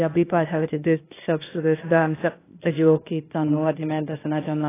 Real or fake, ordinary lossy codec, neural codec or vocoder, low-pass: fake; AAC, 16 kbps; codec, 16 kHz, 0.8 kbps, ZipCodec; 3.6 kHz